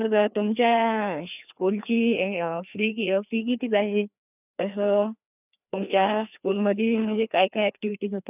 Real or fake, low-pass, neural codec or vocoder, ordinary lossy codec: fake; 3.6 kHz; codec, 16 kHz, 2 kbps, FreqCodec, larger model; none